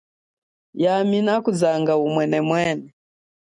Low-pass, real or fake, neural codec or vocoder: 10.8 kHz; real; none